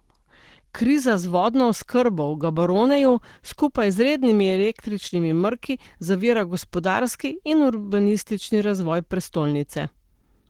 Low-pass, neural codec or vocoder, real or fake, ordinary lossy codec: 19.8 kHz; codec, 44.1 kHz, 7.8 kbps, Pupu-Codec; fake; Opus, 16 kbps